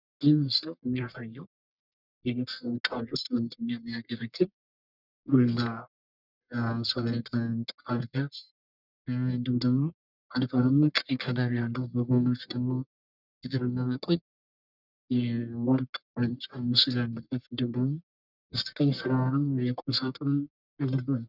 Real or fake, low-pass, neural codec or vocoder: fake; 5.4 kHz; codec, 44.1 kHz, 1.7 kbps, Pupu-Codec